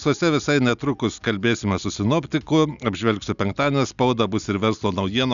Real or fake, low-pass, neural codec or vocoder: real; 7.2 kHz; none